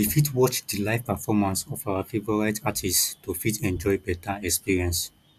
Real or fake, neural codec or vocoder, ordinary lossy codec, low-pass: real; none; none; 14.4 kHz